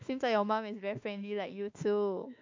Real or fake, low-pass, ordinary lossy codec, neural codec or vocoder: fake; 7.2 kHz; MP3, 64 kbps; autoencoder, 48 kHz, 32 numbers a frame, DAC-VAE, trained on Japanese speech